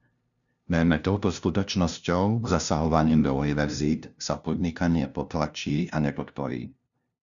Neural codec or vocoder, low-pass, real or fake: codec, 16 kHz, 0.5 kbps, FunCodec, trained on LibriTTS, 25 frames a second; 7.2 kHz; fake